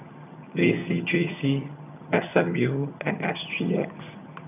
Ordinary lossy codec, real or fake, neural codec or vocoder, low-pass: none; fake; vocoder, 22.05 kHz, 80 mel bands, HiFi-GAN; 3.6 kHz